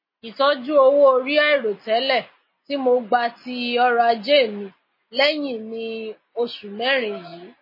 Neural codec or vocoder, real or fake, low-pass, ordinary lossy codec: none; real; 5.4 kHz; MP3, 24 kbps